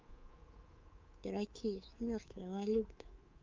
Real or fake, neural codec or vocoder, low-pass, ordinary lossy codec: fake; codec, 24 kHz, 3.1 kbps, DualCodec; 7.2 kHz; Opus, 24 kbps